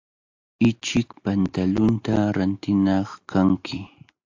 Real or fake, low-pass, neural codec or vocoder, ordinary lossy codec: real; 7.2 kHz; none; AAC, 48 kbps